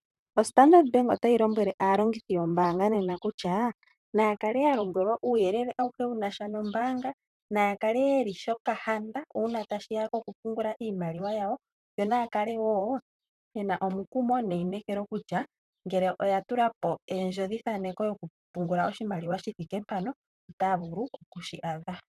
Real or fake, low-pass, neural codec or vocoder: fake; 14.4 kHz; vocoder, 44.1 kHz, 128 mel bands, Pupu-Vocoder